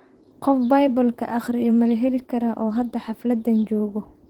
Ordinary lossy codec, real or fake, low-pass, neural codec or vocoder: Opus, 16 kbps; fake; 19.8 kHz; vocoder, 44.1 kHz, 128 mel bands, Pupu-Vocoder